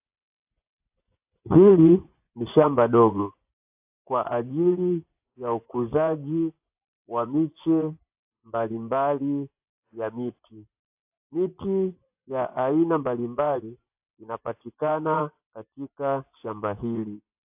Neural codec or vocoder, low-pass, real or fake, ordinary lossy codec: vocoder, 24 kHz, 100 mel bands, Vocos; 3.6 kHz; fake; AAC, 32 kbps